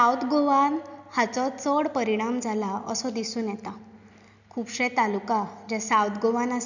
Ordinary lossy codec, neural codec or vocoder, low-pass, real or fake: none; none; 7.2 kHz; real